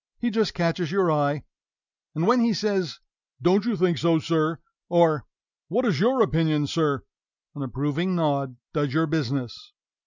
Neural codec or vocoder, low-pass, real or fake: none; 7.2 kHz; real